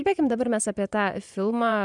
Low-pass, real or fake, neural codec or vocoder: 10.8 kHz; fake; vocoder, 24 kHz, 100 mel bands, Vocos